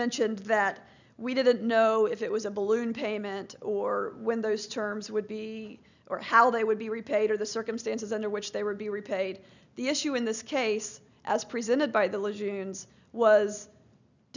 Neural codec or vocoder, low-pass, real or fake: none; 7.2 kHz; real